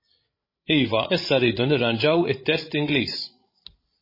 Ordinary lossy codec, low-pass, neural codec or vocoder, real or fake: MP3, 24 kbps; 5.4 kHz; codec, 16 kHz, 16 kbps, FreqCodec, larger model; fake